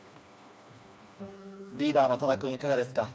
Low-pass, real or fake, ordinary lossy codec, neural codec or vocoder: none; fake; none; codec, 16 kHz, 2 kbps, FreqCodec, smaller model